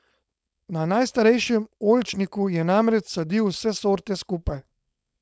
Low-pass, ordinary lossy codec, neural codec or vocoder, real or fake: none; none; codec, 16 kHz, 4.8 kbps, FACodec; fake